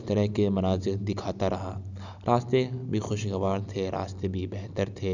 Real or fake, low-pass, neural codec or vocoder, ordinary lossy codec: real; 7.2 kHz; none; none